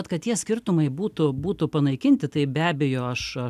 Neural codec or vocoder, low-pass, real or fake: none; 14.4 kHz; real